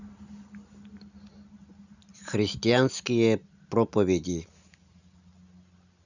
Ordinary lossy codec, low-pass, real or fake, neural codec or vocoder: none; 7.2 kHz; real; none